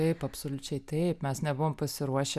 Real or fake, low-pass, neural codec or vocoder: real; 14.4 kHz; none